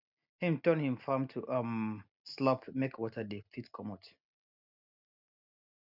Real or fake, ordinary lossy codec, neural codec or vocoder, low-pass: real; none; none; 5.4 kHz